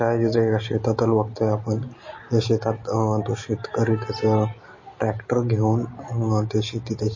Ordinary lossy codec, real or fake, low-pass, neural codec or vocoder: MP3, 32 kbps; real; 7.2 kHz; none